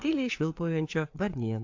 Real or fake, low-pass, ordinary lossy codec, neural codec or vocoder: fake; 7.2 kHz; AAC, 48 kbps; codec, 16 kHz, 4 kbps, FreqCodec, larger model